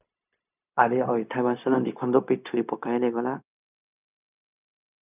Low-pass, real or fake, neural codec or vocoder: 3.6 kHz; fake; codec, 16 kHz, 0.4 kbps, LongCat-Audio-Codec